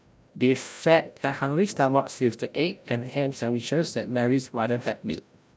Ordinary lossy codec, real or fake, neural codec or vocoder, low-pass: none; fake; codec, 16 kHz, 0.5 kbps, FreqCodec, larger model; none